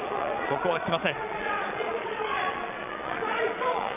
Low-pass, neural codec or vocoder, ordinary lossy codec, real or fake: 3.6 kHz; vocoder, 22.05 kHz, 80 mel bands, Vocos; none; fake